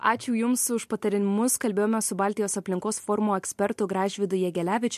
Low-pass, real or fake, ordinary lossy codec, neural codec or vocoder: 14.4 kHz; real; MP3, 64 kbps; none